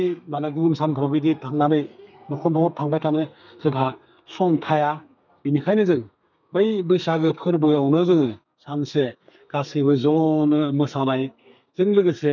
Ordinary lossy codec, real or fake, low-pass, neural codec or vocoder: none; fake; 7.2 kHz; codec, 32 kHz, 1.9 kbps, SNAC